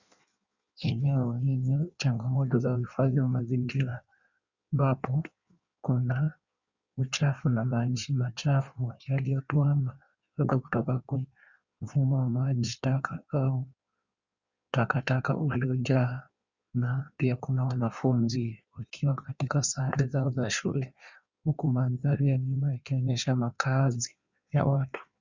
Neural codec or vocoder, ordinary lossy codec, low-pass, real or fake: codec, 16 kHz in and 24 kHz out, 1.1 kbps, FireRedTTS-2 codec; Opus, 64 kbps; 7.2 kHz; fake